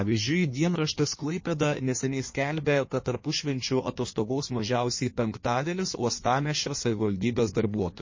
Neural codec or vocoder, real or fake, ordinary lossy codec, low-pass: codec, 16 kHz in and 24 kHz out, 1.1 kbps, FireRedTTS-2 codec; fake; MP3, 32 kbps; 7.2 kHz